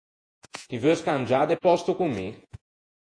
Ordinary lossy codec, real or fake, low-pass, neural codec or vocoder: AAC, 48 kbps; fake; 9.9 kHz; vocoder, 48 kHz, 128 mel bands, Vocos